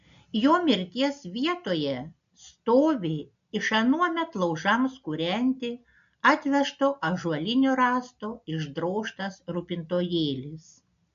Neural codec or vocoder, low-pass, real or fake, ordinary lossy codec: none; 7.2 kHz; real; AAC, 96 kbps